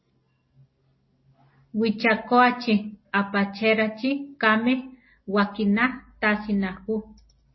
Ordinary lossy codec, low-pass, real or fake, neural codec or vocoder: MP3, 24 kbps; 7.2 kHz; real; none